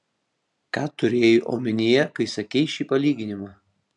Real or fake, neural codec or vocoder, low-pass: real; none; 10.8 kHz